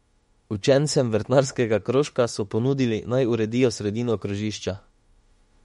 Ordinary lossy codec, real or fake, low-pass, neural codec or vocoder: MP3, 48 kbps; fake; 19.8 kHz; autoencoder, 48 kHz, 32 numbers a frame, DAC-VAE, trained on Japanese speech